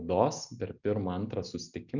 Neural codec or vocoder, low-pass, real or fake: none; 7.2 kHz; real